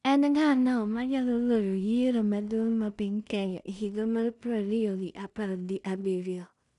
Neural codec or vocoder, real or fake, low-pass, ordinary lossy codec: codec, 16 kHz in and 24 kHz out, 0.4 kbps, LongCat-Audio-Codec, two codebook decoder; fake; 10.8 kHz; none